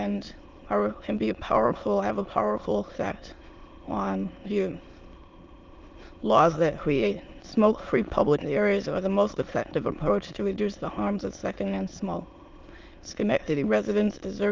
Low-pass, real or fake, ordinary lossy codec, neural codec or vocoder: 7.2 kHz; fake; Opus, 32 kbps; autoencoder, 22.05 kHz, a latent of 192 numbers a frame, VITS, trained on many speakers